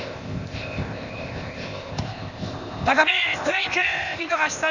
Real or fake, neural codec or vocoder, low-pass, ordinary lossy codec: fake; codec, 16 kHz, 0.8 kbps, ZipCodec; 7.2 kHz; Opus, 64 kbps